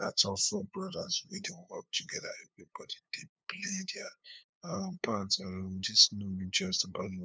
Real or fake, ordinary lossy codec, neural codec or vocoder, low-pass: fake; none; codec, 16 kHz, 2 kbps, FunCodec, trained on LibriTTS, 25 frames a second; none